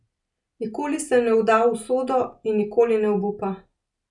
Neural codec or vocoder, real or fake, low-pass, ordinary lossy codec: none; real; 10.8 kHz; none